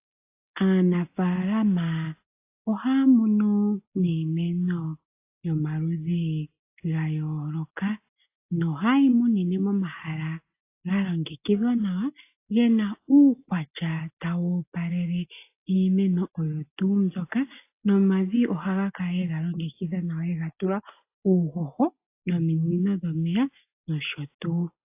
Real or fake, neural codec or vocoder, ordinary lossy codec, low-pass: real; none; AAC, 24 kbps; 3.6 kHz